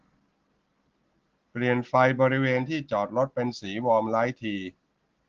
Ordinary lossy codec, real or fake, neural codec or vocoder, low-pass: Opus, 16 kbps; real; none; 7.2 kHz